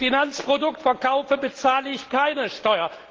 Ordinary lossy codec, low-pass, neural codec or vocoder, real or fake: Opus, 16 kbps; 7.2 kHz; none; real